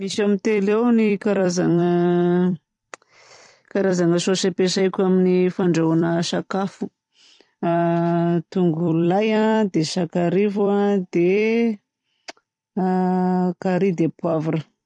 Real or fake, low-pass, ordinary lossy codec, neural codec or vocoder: fake; 10.8 kHz; none; vocoder, 44.1 kHz, 128 mel bands every 256 samples, BigVGAN v2